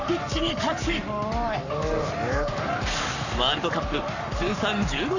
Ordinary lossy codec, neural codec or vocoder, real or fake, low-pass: none; codec, 44.1 kHz, 7.8 kbps, Pupu-Codec; fake; 7.2 kHz